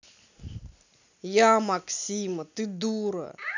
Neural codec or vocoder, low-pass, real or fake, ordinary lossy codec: none; 7.2 kHz; real; none